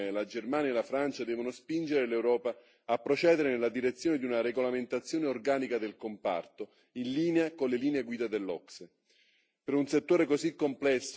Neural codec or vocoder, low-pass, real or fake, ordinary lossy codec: none; none; real; none